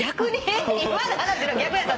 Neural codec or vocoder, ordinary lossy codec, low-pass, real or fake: none; none; none; real